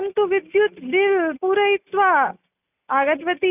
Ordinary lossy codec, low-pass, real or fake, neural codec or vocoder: none; 3.6 kHz; real; none